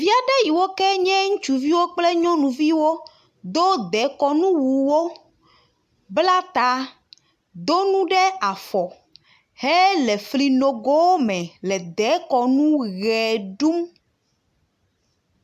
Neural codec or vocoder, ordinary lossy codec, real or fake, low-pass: none; AAC, 96 kbps; real; 14.4 kHz